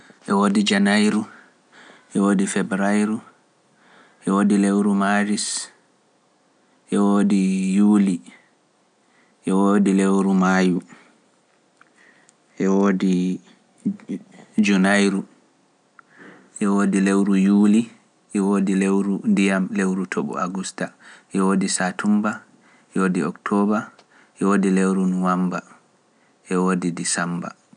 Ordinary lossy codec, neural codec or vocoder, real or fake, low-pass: none; none; real; 9.9 kHz